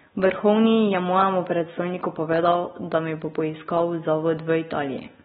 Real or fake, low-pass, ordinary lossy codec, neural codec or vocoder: real; 19.8 kHz; AAC, 16 kbps; none